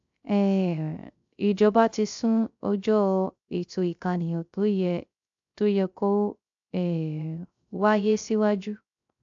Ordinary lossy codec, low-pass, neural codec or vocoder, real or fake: AAC, 64 kbps; 7.2 kHz; codec, 16 kHz, 0.3 kbps, FocalCodec; fake